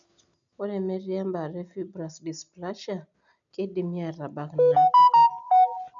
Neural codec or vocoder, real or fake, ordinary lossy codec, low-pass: none; real; none; 7.2 kHz